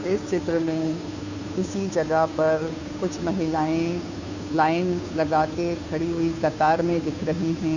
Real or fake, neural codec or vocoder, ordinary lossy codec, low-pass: fake; codec, 16 kHz, 2 kbps, FunCodec, trained on Chinese and English, 25 frames a second; none; 7.2 kHz